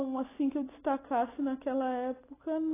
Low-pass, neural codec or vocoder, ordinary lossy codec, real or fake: 3.6 kHz; none; AAC, 16 kbps; real